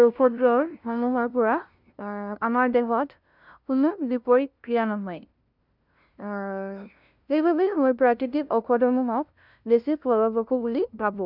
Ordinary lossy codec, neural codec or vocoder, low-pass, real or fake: none; codec, 16 kHz, 0.5 kbps, FunCodec, trained on LibriTTS, 25 frames a second; 5.4 kHz; fake